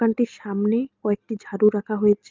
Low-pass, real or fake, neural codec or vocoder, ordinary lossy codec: 7.2 kHz; real; none; Opus, 24 kbps